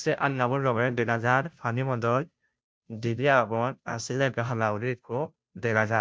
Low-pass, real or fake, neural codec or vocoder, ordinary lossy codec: none; fake; codec, 16 kHz, 0.5 kbps, FunCodec, trained on Chinese and English, 25 frames a second; none